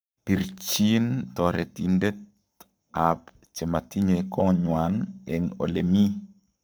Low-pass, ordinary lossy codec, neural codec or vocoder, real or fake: none; none; codec, 44.1 kHz, 7.8 kbps, Pupu-Codec; fake